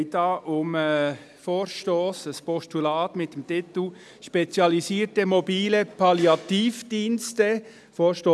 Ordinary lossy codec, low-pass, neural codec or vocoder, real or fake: none; none; none; real